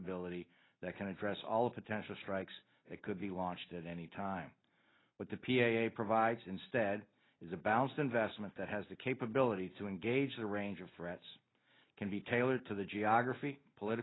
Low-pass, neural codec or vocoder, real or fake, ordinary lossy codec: 7.2 kHz; none; real; AAC, 16 kbps